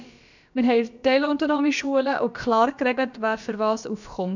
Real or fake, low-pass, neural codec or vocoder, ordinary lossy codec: fake; 7.2 kHz; codec, 16 kHz, about 1 kbps, DyCAST, with the encoder's durations; none